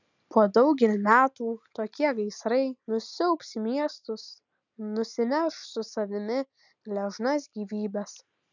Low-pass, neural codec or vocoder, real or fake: 7.2 kHz; none; real